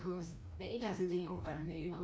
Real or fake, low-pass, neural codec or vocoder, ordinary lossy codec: fake; none; codec, 16 kHz, 1 kbps, FreqCodec, larger model; none